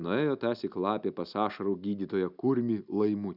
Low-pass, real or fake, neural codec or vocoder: 5.4 kHz; real; none